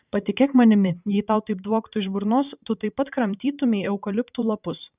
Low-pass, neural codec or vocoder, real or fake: 3.6 kHz; vocoder, 44.1 kHz, 128 mel bands every 512 samples, BigVGAN v2; fake